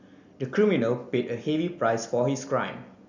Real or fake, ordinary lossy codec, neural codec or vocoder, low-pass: real; none; none; 7.2 kHz